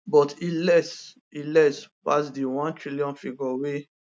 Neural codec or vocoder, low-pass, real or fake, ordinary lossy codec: none; none; real; none